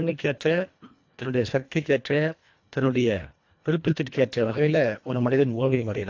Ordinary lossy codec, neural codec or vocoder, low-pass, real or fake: AAC, 48 kbps; codec, 24 kHz, 1.5 kbps, HILCodec; 7.2 kHz; fake